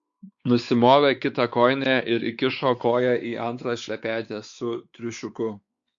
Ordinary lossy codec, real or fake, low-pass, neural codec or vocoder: Opus, 64 kbps; fake; 7.2 kHz; codec, 16 kHz, 4 kbps, X-Codec, WavLM features, trained on Multilingual LibriSpeech